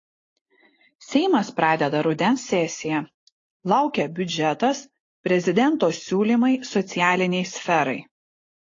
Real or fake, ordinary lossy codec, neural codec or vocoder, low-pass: real; AAC, 32 kbps; none; 7.2 kHz